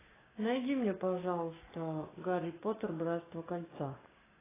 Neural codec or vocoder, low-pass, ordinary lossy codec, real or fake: codec, 16 kHz, 6 kbps, DAC; 3.6 kHz; AAC, 16 kbps; fake